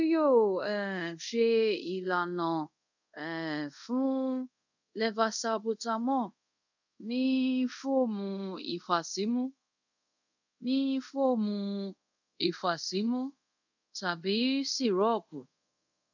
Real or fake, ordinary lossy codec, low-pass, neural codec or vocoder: fake; none; 7.2 kHz; codec, 24 kHz, 0.5 kbps, DualCodec